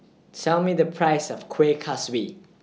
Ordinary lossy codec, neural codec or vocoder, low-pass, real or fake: none; none; none; real